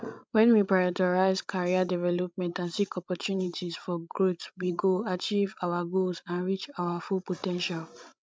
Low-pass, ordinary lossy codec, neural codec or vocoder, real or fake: none; none; codec, 16 kHz, 16 kbps, FreqCodec, larger model; fake